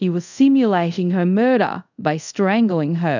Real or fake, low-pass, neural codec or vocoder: fake; 7.2 kHz; codec, 24 kHz, 0.5 kbps, DualCodec